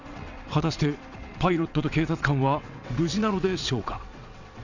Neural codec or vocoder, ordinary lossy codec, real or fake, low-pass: vocoder, 22.05 kHz, 80 mel bands, WaveNeXt; none; fake; 7.2 kHz